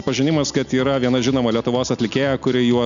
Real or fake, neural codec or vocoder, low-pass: real; none; 7.2 kHz